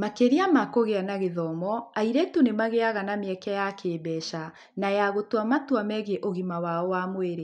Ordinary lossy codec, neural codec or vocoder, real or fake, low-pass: none; none; real; 10.8 kHz